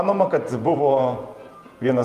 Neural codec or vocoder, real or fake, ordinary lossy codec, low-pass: vocoder, 48 kHz, 128 mel bands, Vocos; fake; Opus, 32 kbps; 14.4 kHz